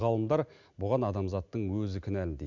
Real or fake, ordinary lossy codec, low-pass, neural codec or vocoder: real; none; 7.2 kHz; none